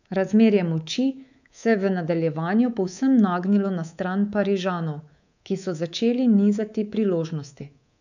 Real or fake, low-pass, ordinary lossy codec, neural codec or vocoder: fake; 7.2 kHz; none; codec, 24 kHz, 3.1 kbps, DualCodec